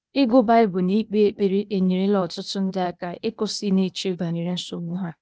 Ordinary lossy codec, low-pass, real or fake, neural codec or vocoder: none; none; fake; codec, 16 kHz, 0.8 kbps, ZipCodec